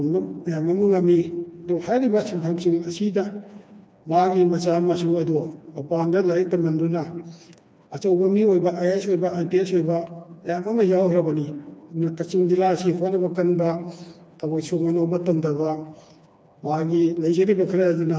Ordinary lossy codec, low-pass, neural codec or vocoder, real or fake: none; none; codec, 16 kHz, 2 kbps, FreqCodec, smaller model; fake